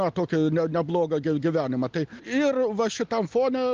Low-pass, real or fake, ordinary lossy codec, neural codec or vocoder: 7.2 kHz; real; Opus, 32 kbps; none